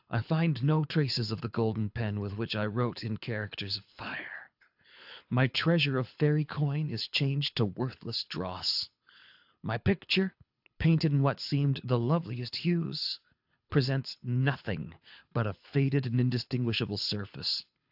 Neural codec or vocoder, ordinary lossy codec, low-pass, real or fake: codec, 24 kHz, 6 kbps, HILCodec; AAC, 48 kbps; 5.4 kHz; fake